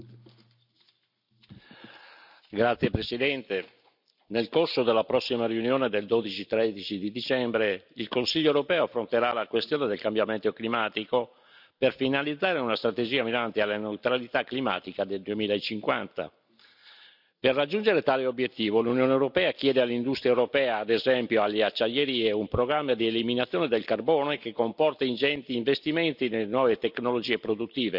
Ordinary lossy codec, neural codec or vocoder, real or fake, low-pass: none; none; real; 5.4 kHz